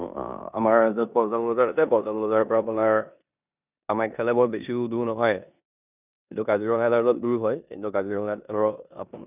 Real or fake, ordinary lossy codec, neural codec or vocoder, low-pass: fake; none; codec, 16 kHz in and 24 kHz out, 0.9 kbps, LongCat-Audio-Codec, four codebook decoder; 3.6 kHz